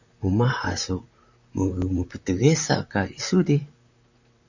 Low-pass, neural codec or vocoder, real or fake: 7.2 kHz; vocoder, 44.1 kHz, 128 mel bands, Pupu-Vocoder; fake